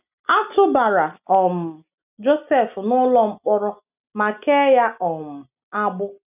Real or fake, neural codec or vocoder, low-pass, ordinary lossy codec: real; none; 3.6 kHz; none